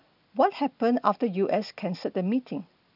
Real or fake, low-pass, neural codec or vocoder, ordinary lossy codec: real; 5.4 kHz; none; none